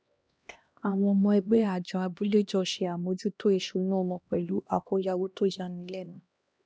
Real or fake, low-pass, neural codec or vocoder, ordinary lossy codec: fake; none; codec, 16 kHz, 1 kbps, X-Codec, HuBERT features, trained on LibriSpeech; none